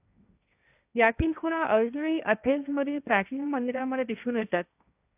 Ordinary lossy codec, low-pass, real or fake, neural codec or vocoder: AAC, 32 kbps; 3.6 kHz; fake; codec, 16 kHz, 1.1 kbps, Voila-Tokenizer